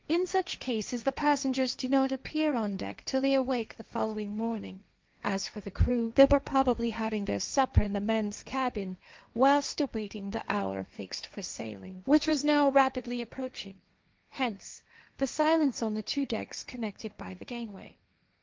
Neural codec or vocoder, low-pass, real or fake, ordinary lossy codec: codec, 16 kHz, 1.1 kbps, Voila-Tokenizer; 7.2 kHz; fake; Opus, 24 kbps